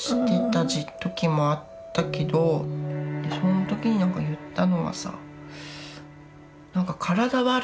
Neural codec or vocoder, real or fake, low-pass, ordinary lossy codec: none; real; none; none